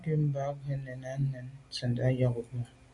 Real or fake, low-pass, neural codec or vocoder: real; 10.8 kHz; none